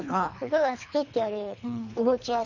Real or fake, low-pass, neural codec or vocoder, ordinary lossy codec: fake; 7.2 kHz; codec, 24 kHz, 3 kbps, HILCodec; none